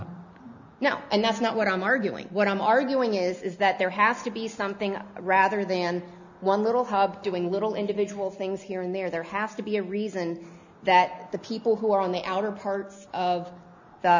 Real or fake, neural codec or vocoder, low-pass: real; none; 7.2 kHz